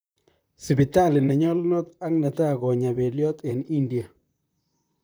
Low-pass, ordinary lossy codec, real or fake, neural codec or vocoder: none; none; fake; vocoder, 44.1 kHz, 128 mel bands, Pupu-Vocoder